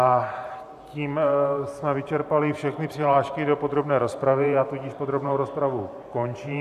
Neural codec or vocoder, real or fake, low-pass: vocoder, 44.1 kHz, 128 mel bands every 512 samples, BigVGAN v2; fake; 14.4 kHz